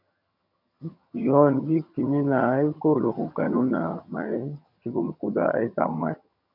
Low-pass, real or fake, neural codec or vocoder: 5.4 kHz; fake; vocoder, 22.05 kHz, 80 mel bands, HiFi-GAN